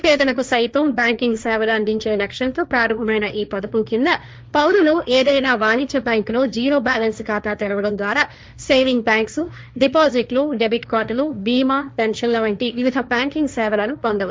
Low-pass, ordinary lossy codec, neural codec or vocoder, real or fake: none; none; codec, 16 kHz, 1.1 kbps, Voila-Tokenizer; fake